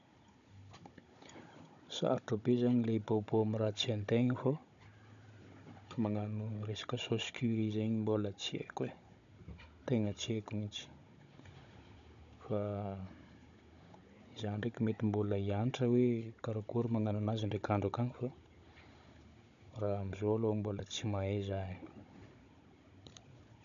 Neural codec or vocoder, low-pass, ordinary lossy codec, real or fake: codec, 16 kHz, 16 kbps, FunCodec, trained on Chinese and English, 50 frames a second; 7.2 kHz; none; fake